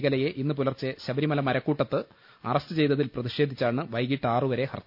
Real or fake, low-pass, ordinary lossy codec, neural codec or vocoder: real; 5.4 kHz; none; none